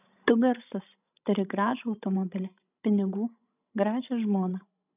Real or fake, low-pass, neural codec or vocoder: fake; 3.6 kHz; codec, 16 kHz, 16 kbps, FreqCodec, larger model